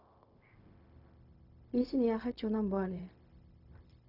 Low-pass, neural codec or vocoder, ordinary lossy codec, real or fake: 5.4 kHz; codec, 16 kHz, 0.4 kbps, LongCat-Audio-Codec; none; fake